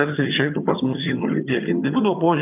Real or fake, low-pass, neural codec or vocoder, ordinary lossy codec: fake; 3.6 kHz; vocoder, 22.05 kHz, 80 mel bands, HiFi-GAN; AAC, 32 kbps